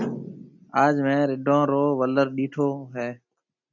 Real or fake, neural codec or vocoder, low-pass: real; none; 7.2 kHz